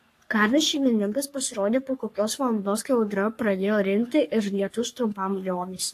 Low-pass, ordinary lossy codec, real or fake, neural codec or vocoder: 14.4 kHz; AAC, 64 kbps; fake; codec, 44.1 kHz, 3.4 kbps, Pupu-Codec